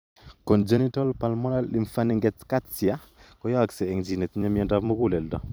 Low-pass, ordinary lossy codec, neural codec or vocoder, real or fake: none; none; vocoder, 44.1 kHz, 128 mel bands every 256 samples, BigVGAN v2; fake